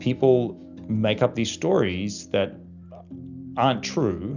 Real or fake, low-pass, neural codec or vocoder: real; 7.2 kHz; none